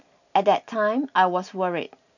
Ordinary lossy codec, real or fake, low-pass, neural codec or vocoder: none; real; 7.2 kHz; none